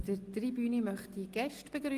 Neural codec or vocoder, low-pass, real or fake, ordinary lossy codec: none; 14.4 kHz; real; Opus, 24 kbps